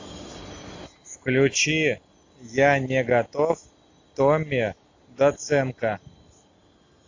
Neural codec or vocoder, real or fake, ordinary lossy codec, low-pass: none; real; AAC, 48 kbps; 7.2 kHz